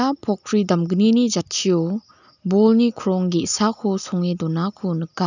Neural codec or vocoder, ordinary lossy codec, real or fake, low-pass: none; none; real; 7.2 kHz